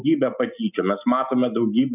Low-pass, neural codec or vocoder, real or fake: 3.6 kHz; none; real